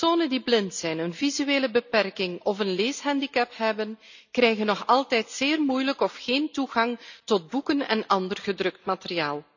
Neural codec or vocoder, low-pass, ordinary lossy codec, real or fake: none; 7.2 kHz; none; real